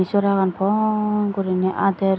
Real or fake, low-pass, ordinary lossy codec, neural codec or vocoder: real; none; none; none